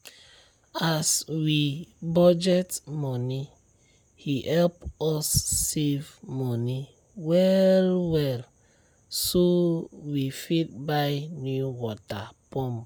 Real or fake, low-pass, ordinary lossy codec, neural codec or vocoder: real; none; none; none